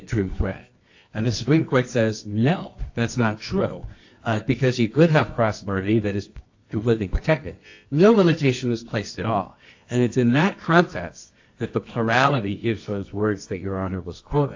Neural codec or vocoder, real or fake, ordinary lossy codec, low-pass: codec, 24 kHz, 0.9 kbps, WavTokenizer, medium music audio release; fake; AAC, 48 kbps; 7.2 kHz